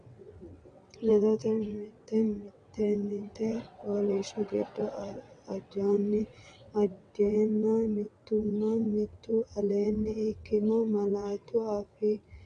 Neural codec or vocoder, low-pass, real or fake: vocoder, 22.05 kHz, 80 mel bands, WaveNeXt; 9.9 kHz; fake